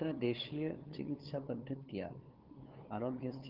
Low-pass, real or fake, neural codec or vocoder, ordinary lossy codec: 5.4 kHz; fake; codec, 16 kHz, 8 kbps, FunCodec, trained on LibriTTS, 25 frames a second; Opus, 16 kbps